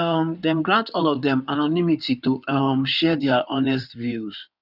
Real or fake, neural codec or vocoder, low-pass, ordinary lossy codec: fake; codec, 16 kHz in and 24 kHz out, 2.2 kbps, FireRedTTS-2 codec; 5.4 kHz; none